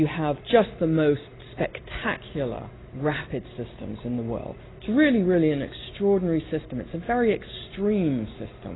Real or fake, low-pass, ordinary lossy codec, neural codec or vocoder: real; 7.2 kHz; AAC, 16 kbps; none